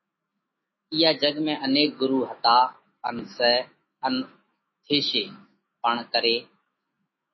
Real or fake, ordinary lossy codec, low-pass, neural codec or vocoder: fake; MP3, 24 kbps; 7.2 kHz; autoencoder, 48 kHz, 128 numbers a frame, DAC-VAE, trained on Japanese speech